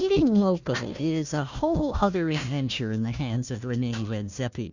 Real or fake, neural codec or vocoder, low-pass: fake; codec, 16 kHz, 1 kbps, FunCodec, trained on Chinese and English, 50 frames a second; 7.2 kHz